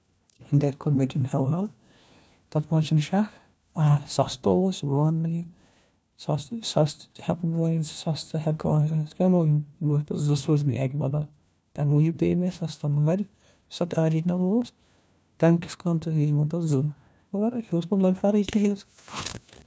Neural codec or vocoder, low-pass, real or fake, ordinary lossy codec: codec, 16 kHz, 1 kbps, FunCodec, trained on LibriTTS, 50 frames a second; none; fake; none